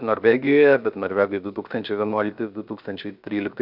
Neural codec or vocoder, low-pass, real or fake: codec, 16 kHz, 0.7 kbps, FocalCodec; 5.4 kHz; fake